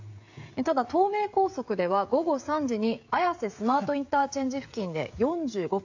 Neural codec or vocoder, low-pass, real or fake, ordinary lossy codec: codec, 16 kHz, 16 kbps, FreqCodec, smaller model; 7.2 kHz; fake; AAC, 48 kbps